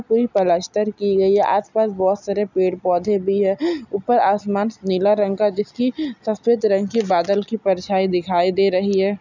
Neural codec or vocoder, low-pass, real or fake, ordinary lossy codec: none; 7.2 kHz; real; none